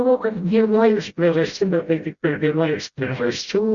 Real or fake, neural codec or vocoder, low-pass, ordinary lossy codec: fake; codec, 16 kHz, 0.5 kbps, FreqCodec, smaller model; 7.2 kHz; Opus, 64 kbps